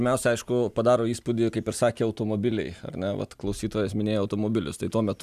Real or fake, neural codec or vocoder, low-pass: real; none; 14.4 kHz